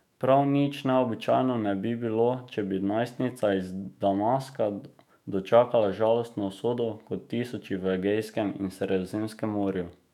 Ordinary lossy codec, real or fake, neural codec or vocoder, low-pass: none; fake; autoencoder, 48 kHz, 128 numbers a frame, DAC-VAE, trained on Japanese speech; 19.8 kHz